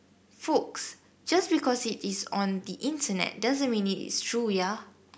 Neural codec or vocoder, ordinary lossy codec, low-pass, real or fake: none; none; none; real